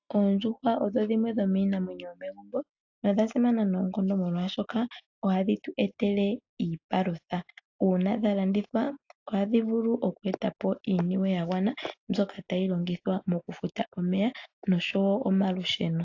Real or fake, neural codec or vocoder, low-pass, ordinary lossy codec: real; none; 7.2 kHz; AAC, 48 kbps